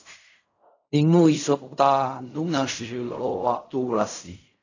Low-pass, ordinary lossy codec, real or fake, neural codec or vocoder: 7.2 kHz; AAC, 48 kbps; fake; codec, 16 kHz in and 24 kHz out, 0.4 kbps, LongCat-Audio-Codec, fine tuned four codebook decoder